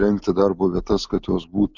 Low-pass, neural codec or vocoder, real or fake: 7.2 kHz; none; real